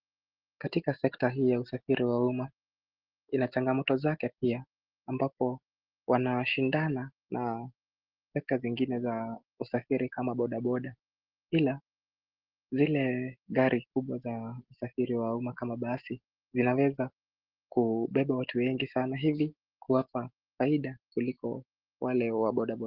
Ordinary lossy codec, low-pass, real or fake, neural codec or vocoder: Opus, 16 kbps; 5.4 kHz; real; none